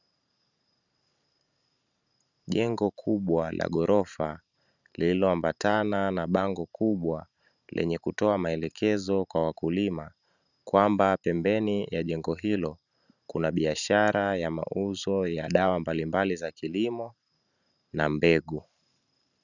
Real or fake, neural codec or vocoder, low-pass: fake; vocoder, 44.1 kHz, 128 mel bands every 512 samples, BigVGAN v2; 7.2 kHz